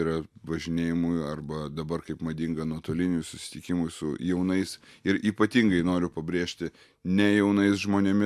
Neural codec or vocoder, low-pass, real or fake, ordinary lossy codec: none; 14.4 kHz; real; AAC, 96 kbps